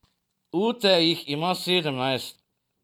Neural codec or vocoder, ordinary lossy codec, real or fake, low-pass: vocoder, 44.1 kHz, 128 mel bands every 512 samples, BigVGAN v2; none; fake; 19.8 kHz